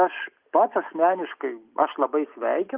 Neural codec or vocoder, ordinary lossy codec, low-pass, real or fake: none; Opus, 24 kbps; 3.6 kHz; real